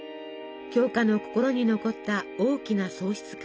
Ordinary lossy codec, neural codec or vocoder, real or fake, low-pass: none; none; real; none